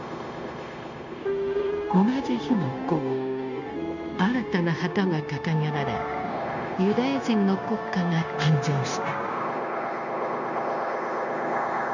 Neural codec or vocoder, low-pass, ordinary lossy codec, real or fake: codec, 16 kHz, 0.9 kbps, LongCat-Audio-Codec; 7.2 kHz; none; fake